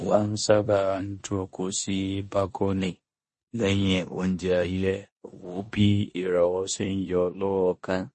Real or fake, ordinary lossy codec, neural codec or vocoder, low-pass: fake; MP3, 32 kbps; codec, 16 kHz in and 24 kHz out, 0.9 kbps, LongCat-Audio-Codec, four codebook decoder; 10.8 kHz